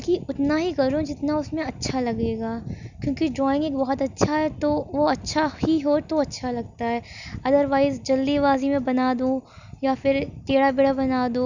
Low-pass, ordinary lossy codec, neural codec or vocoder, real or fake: 7.2 kHz; none; none; real